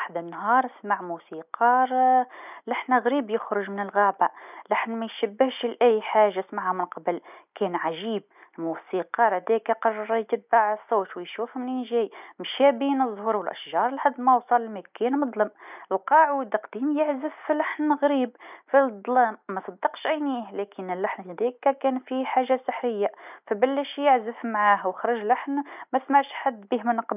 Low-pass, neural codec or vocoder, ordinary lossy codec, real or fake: 3.6 kHz; none; none; real